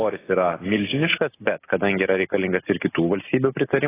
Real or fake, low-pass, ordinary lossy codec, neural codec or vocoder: real; 3.6 kHz; AAC, 16 kbps; none